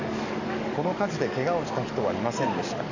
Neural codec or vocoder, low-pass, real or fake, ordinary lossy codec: codec, 44.1 kHz, 7.8 kbps, DAC; 7.2 kHz; fake; none